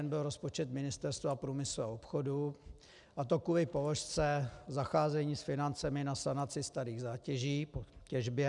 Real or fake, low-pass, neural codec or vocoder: real; 10.8 kHz; none